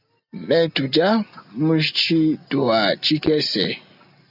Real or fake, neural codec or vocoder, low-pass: real; none; 5.4 kHz